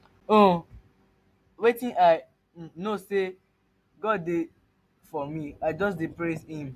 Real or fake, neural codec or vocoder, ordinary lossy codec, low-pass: real; none; AAC, 64 kbps; 14.4 kHz